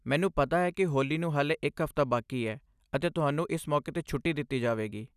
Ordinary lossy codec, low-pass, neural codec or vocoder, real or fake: none; 14.4 kHz; none; real